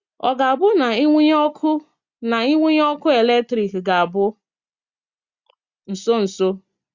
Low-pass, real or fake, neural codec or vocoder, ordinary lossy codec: 7.2 kHz; real; none; Opus, 64 kbps